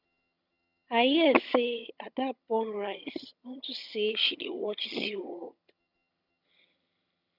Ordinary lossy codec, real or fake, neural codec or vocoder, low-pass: none; fake; vocoder, 22.05 kHz, 80 mel bands, HiFi-GAN; 5.4 kHz